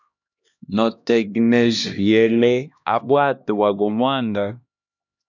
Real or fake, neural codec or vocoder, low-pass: fake; codec, 16 kHz, 1 kbps, X-Codec, HuBERT features, trained on LibriSpeech; 7.2 kHz